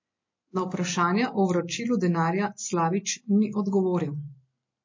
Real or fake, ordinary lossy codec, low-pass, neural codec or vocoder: real; MP3, 32 kbps; 7.2 kHz; none